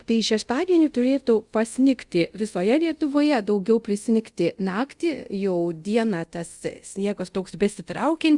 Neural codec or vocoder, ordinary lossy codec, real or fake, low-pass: codec, 24 kHz, 0.5 kbps, DualCodec; Opus, 64 kbps; fake; 10.8 kHz